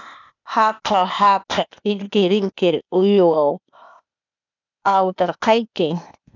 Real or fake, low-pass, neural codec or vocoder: fake; 7.2 kHz; codec, 16 kHz, 0.8 kbps, ZipCodec